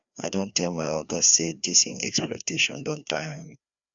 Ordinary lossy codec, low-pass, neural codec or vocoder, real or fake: Opus, 64 kbps; 7.2 kHz; codec, 16 kHz, 2 kbps, FreqCodec, larger model; fake